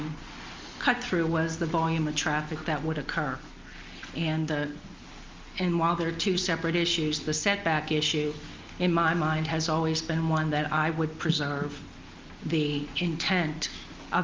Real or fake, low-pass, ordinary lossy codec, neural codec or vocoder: real; 7.2 kHz; Opus, 32 kbps; none